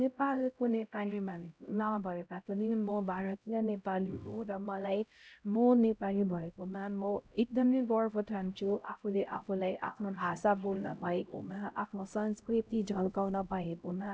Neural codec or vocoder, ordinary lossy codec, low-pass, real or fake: codec, 16 kHz, 0.5 kbps, X-Codec, HuBERT features, trained on LibriSpeech; none; none; fake